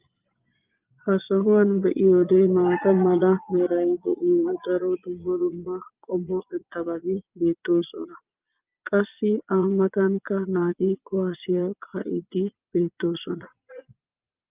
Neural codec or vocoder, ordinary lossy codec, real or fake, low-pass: vocoder, 22.05 kHz, 80 mel bands, Vocos; Opus, 24 kbps; fake; 3.6 kHz